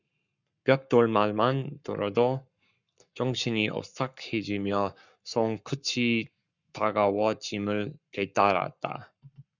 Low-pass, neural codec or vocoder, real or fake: 7.2 kHz; codec, 44.1 kHz, 7.8 kbps, Pupu-Codec; fake